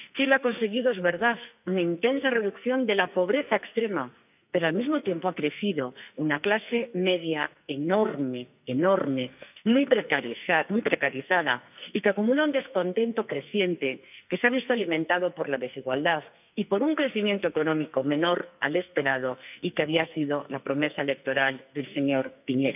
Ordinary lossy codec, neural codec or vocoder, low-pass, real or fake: none; codec, 44.1 kHz, 2.6 kbps, SNAC; 3.6 kHz; fake